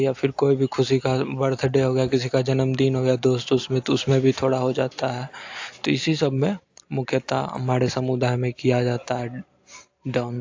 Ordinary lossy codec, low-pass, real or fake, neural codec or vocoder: AAC, 48 kbps; 7.2 kHz; real; none